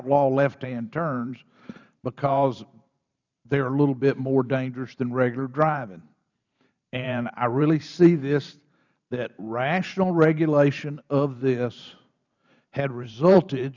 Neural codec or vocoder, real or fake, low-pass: vocoder, 44.1 kHz, 128 mel bands every 512 samples, BigVGAN v2; fake; 7.2 kHz